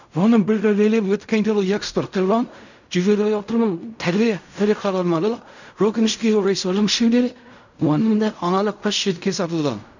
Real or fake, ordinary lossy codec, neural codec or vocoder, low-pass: fake; none; codec, 16 kHz in and 24 kHz out, 0.4 kbps, LongCat-Audio-Codec, fine tuned four codebook decoder; 7.2 kHz